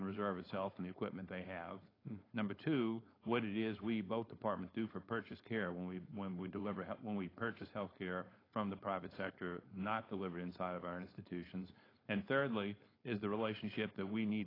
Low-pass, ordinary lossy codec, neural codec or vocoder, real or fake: 5.4 kHz; AAC, 24 kbps; codec, 16 kHz, 4.8 kbps, FACodec; fake